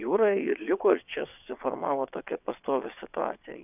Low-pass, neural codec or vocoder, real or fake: 3.6 kHz; vocoder, 22.05 kHz, 80 mel bands, WaveNeXt; fake